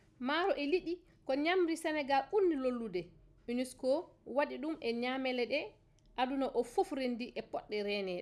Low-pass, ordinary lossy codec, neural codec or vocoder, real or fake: none; none; none; real